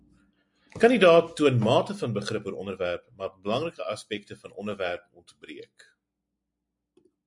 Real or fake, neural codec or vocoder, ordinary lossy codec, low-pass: real; none; MP3, 64 kbps; 10.8 kHz